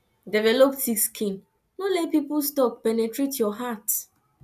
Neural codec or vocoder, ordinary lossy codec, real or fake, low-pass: none; none; real; 14.4 kHz